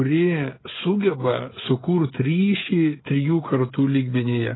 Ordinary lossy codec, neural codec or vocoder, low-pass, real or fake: AAC, 16 kbps; codec, 16 kHz, 16 kbps, FreqCodec, smaller model; 7.2 kHz; fake